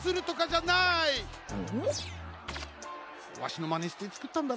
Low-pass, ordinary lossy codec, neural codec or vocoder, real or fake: none; none; none; real